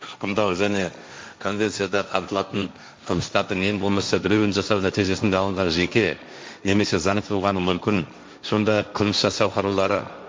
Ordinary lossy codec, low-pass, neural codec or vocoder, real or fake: none; none; codec, 16 kHz, 1.1 kbps, Voila-Tokenizer; fake